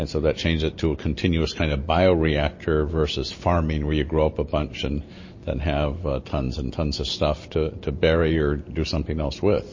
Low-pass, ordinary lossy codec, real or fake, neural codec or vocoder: 7.2 kHz; MP3, 32 kbps; real; none